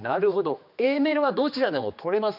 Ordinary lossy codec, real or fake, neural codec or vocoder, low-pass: none; fake; codec, 16 kHz, 2 kbps, X-Codec, HuBERT features, trained on general audio; 5.4 kHz